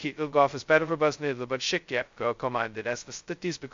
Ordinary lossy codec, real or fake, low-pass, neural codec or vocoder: MP3, 48 kbps; fake; 7.2 kHz; codec, 16 kHz, 0.2 kbps, FocalCodec